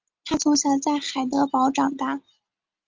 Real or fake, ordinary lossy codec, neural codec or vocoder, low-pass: real; Opus, 32 kbps; none; 7.2 kHz